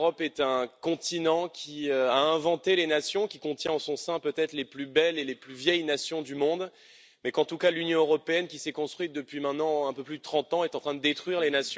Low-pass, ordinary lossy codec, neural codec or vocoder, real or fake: none; none; none; real